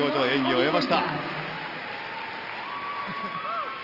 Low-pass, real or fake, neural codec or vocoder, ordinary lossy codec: 5.4 kHz; real; none; Opus, 24 kbps